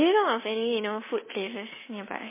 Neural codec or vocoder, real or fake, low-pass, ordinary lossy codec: codec, 24 kHz, 3.1 kbps, DualCodec; fake; 3.6 kHz; MP3, 16 kbps